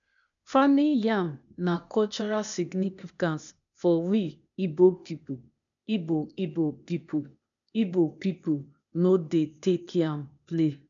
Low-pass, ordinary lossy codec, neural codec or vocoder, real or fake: 7.2 kHz; none; codec, 16 kHz, 0.8 kbps, ZipCodec; fake